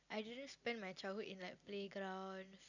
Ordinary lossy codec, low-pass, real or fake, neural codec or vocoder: none; 7.2 kHz; real; none